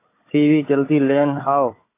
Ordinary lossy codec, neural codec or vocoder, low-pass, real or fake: AAC, 24 kbps; codec, 16 kHz, 4 kbps, FunCodec, trained on Chinese and English, 50 frames a second; 3.6 kHz; fake